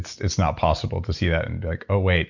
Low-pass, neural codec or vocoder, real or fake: 7.2 kHz; none; real